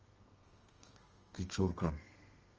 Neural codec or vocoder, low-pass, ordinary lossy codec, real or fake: codec, 32 kHz, 1.9 kbps, SNAC; 7.2 kHz; Opus, 24 kbps; fake